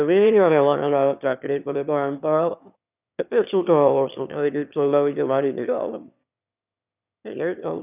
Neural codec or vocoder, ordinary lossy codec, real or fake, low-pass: autoencoder, 22.05 kHz, a latent of 192 numbers a frame, VITS, trained on one speaker; none; fake; 3.6 kHz